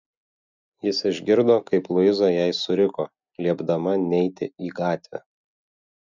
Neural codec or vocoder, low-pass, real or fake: none; 7.2 kHz; real